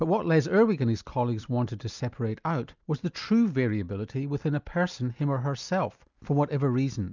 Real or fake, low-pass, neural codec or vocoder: real; 7.2 kHz; none